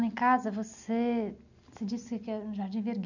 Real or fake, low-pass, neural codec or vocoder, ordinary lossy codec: real; 7.2 kHz; none; none